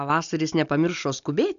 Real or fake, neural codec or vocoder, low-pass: real; none; 7.2 kHz